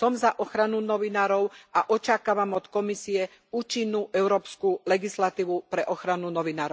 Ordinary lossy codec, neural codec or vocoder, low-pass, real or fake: none; none; none; real